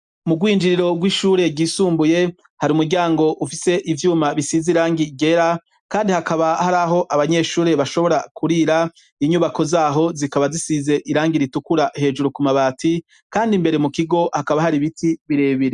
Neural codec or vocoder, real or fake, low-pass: none; real; 10.8 kHz